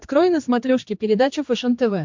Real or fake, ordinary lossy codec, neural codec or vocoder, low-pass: fake; MP3, 64 kbps; codec, 16 kHz, 2 kbps, X-Codec, HuBERT features, trained on general audio; 7.2 kHz